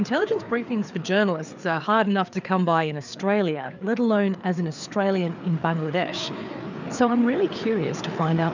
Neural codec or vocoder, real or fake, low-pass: codec, 16 kHz, 4 kbps, FreqCodec, larger model; fake; 7.2 kHz